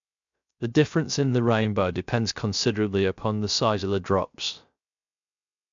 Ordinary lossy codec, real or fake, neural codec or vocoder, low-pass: MP3, 64 kbps; fake; codec, 16 kHz, 0.3 kbps, FocalCodec; 7.2 kHz